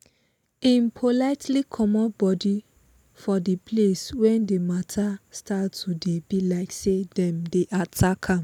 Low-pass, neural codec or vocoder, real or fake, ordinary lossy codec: 19.8 kHz; none; real; none